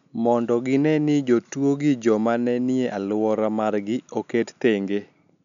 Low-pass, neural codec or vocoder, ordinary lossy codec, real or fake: 7.2 kHz; none; none; real